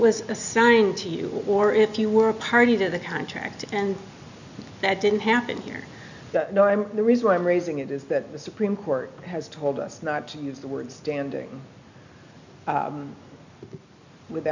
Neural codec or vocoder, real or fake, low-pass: none; real; 7.2 kHz